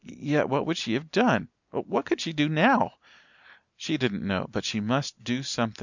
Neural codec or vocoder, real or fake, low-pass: none; real; 7.2 kHz